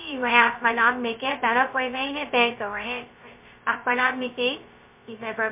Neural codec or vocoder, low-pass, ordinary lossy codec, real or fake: codec, 16 kHz, 0.2 kbps, FocalCodec; 3.6 kHz; MP3, 32 kbps; fake